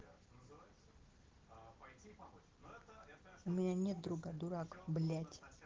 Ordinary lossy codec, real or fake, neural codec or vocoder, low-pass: Opus, 16 kbps; real; none; 7.2 kHz